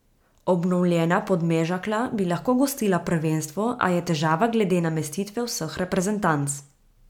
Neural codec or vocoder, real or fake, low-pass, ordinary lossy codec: none; real; 19.8 kHz; MP3, 96 kbps